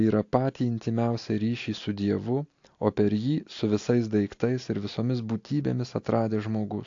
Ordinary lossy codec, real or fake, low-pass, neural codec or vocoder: AAC, 48 kbps; real; 7.2 kHz; none